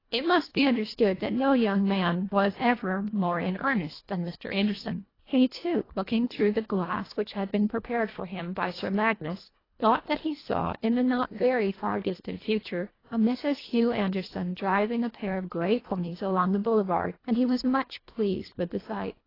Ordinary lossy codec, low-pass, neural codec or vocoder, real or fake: AAC, 24 kbps; 5.4 kHz; codec, 24 kHz, 1.5 kbps, HILCodec; fake